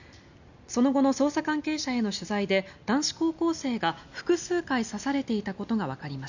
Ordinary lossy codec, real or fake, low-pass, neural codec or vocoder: none; real; 7.2 kHz; none